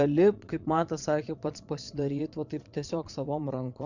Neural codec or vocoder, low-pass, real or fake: vocoder, 22.05 kHz, 80 mel bands, Vocos; 7.2 kHz; fake